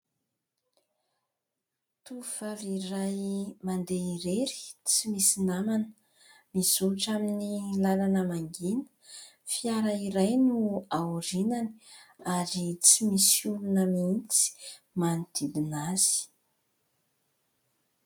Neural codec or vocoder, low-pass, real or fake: none; 19.8 kHz; real